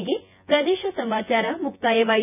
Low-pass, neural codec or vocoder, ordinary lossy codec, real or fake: 3.6 kHz; vocoder, 24 kHz, 100 mel bands, Vocos; none; fake